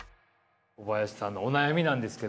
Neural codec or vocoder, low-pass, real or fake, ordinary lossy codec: none; none; real; none